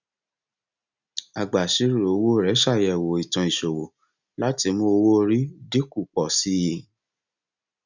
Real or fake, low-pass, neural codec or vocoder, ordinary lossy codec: real; 7.2 kHz; none; none